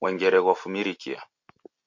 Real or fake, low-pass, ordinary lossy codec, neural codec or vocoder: real; 7.2 kHz; MP3, 48 kbps; none